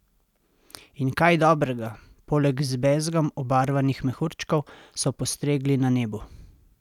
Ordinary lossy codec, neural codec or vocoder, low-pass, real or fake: none; none; 19.8 kHz; real